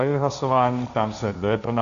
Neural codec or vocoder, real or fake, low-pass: codec, 16 kHz, 1.1 kbps, Voila-Tokenizer; fake; 7.2 kHz